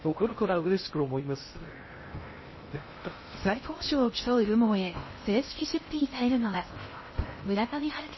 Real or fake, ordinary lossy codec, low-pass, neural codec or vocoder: fake; MP3, 24 kbps; 7.2 kHz; codec, 16 kHz in and 24 kHz out, 0.6 kbps, FocalCodec, streaming, 2048 codes